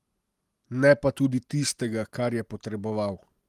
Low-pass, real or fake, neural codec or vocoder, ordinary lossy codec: 14.4 kHz; real; none; Opus, 24 kbps